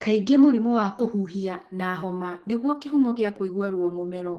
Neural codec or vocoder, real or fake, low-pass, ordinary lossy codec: codec, 32 kHz, 1.9 kbps, SNAC; fake; 14.4 kHz; Opus, 16 kbps